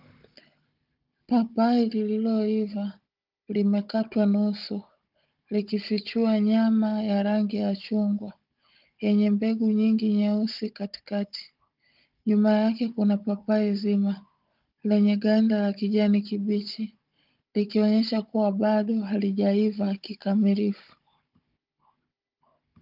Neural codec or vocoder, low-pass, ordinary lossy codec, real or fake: codec, 16 kHz, 16 kbps, FunCodec, trained on Chinese and English, 50 frames a second; 5.4 kHz; Opus, 32 kbps; fake